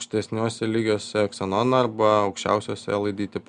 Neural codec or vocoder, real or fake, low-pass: none; real; 9.9 kHz